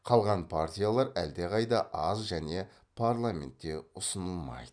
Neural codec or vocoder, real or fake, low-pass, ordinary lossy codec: none; real; 9.9 kHz; none